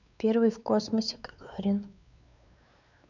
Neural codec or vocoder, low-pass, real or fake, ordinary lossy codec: codec, 16 kHz, 4 kbps, X-Codec, WavLM features, trained on Multilingual LibriSpeech; 7.2 kHz; fake; none